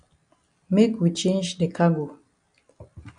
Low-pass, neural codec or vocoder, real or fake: 9.9 kHz; none; real